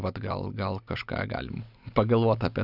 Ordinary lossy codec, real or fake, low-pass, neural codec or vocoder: Opus, 64 kbps; real; 5.4 kHz; none